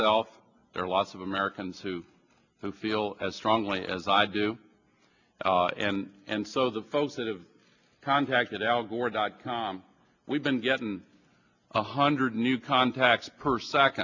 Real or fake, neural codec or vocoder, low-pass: real; none; 7.2 kHz